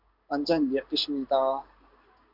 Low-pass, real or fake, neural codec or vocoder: 5.4 kHz; fake; codec, 16 kHz in and 24 kHz out, 1 kbps, XY-Tokenizer